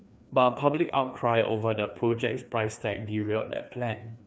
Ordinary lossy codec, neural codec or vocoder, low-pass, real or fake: none; codec, 16 kHz, 2 kbps, FreqCodec, larger model; none; fake